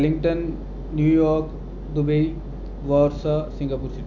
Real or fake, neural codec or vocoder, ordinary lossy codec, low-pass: real; none; MP3, 64 kbps; 7.2 kHz